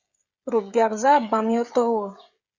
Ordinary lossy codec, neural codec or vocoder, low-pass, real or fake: Opus, 64 kbps; codec, 16 kHz, 16 kbps, FreqCodec, smaller model; 7.2 kHz; fake